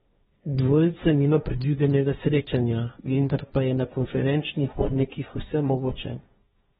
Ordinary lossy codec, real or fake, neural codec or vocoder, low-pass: AAC, 16 kbps; fake; codec, 16 kHz, 1.1 kbps, Voila-Tokenizer; 7.2 kHz